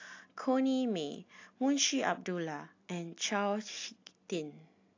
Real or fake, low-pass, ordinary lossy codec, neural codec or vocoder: real; 7.2 kHz; AAC, 48 kbps; none